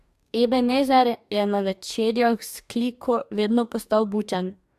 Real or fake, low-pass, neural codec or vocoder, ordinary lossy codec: fake; 14.4 kHz; codec, 44.1 kHz, 2.6 kbps, DAC; AAC, 96 kbps